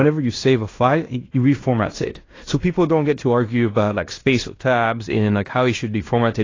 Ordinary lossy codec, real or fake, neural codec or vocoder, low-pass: AAC, 32 kbps; fake; codec, 16 kHz in and 24 kHz out, 0.9 kbps, LongCat-Audio-Codec, fine tuned four codebook decoder; 7.2 kHz